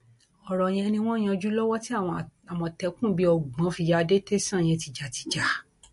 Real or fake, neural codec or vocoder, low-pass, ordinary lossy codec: real; none; 14.4 kHz; MP3, 48 kbps